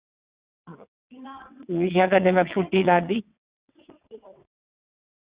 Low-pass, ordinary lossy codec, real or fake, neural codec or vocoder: 3.6 kHz; Opus, 64 kbps; fake; vocoder, 22.05 kHz, 80 mel bands, WaveNeXt